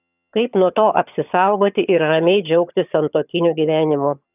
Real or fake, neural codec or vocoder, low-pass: fake; vocoder, 22.05 kHz, 80 mel bands, HiFi-GAN; 3.6 kHz